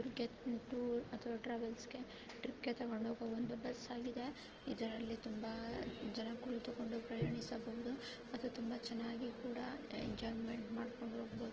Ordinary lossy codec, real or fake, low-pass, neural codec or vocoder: Opus, 16 kbps; real; 7.2 kHz; none